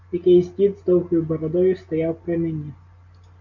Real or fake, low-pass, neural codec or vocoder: real; 7.2 kHz; none